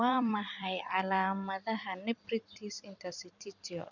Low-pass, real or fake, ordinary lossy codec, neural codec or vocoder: 7.2 kHz; fake; none; vocoder, 44.1 kHz, 128 mel bands every 256 samples, BigVGAN v2